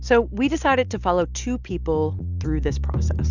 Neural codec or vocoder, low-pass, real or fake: none; 7.2 kHz; real